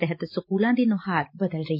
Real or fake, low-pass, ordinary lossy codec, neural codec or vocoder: real; 5.4 kHz; MP3, 32 kbps; none